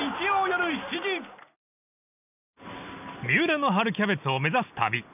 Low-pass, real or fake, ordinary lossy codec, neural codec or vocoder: 3.6 kHz; real; none; none